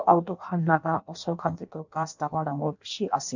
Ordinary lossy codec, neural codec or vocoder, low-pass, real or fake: none; codec, 16 kHz in and 24 kHz out, 0.6 kbps, FireRedTTS-2 codec; 7.2 kHz; fake